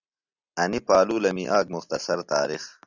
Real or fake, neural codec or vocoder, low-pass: real; none; 7.2 kHz